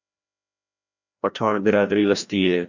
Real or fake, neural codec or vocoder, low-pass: fake; codec, 16 kHz, 1 kbps, FreqCodec, larger model; 7.2 kHz